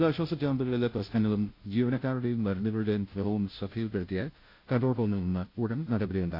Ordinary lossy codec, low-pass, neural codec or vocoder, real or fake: AAC, 32 kbps; 5.4 kHz; codec, 16 kHz, 0.5 kbps, FunCodec, trained on Chinese and English, 25 frames a second; fake